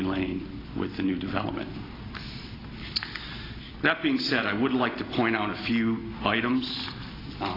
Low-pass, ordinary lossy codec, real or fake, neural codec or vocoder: 5.4 kHz; AAC, 24 kbps; real; none